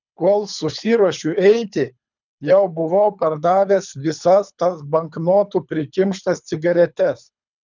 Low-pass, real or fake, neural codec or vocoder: 7.2 kHz; fake; codec, 24 kHz, 3 kbps, HILCodec